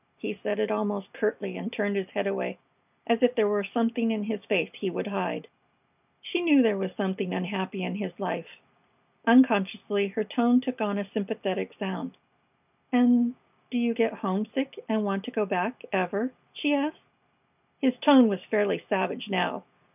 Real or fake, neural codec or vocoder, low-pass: real; none; 3.6 kHz